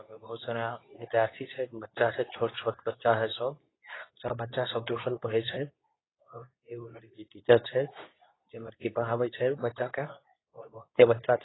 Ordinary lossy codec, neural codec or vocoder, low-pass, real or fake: AAC, 16 kbps; codec, 24 kHz, 0.9 kbps, WavTokenizer, medium speech release version 2; 7.2 kHz; fake